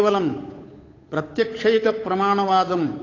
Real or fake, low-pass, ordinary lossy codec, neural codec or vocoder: fake; 7.2 kHz; AAC, 32 kbps; codec, 16 kHz, 8 kbps, FunCodec, trained on Chinese and English, 25 frames a second